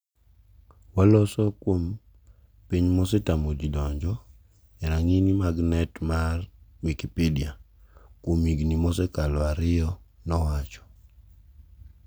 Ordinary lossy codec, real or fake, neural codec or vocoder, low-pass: none; real; none; none